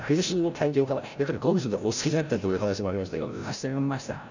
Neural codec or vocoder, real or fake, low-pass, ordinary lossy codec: codec, 16 kHz, 0.5 kbps, FreqCodec, larger model; fake; 7.2 kHz; none